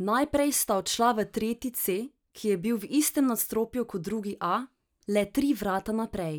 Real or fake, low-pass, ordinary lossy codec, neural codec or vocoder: real; none; none; none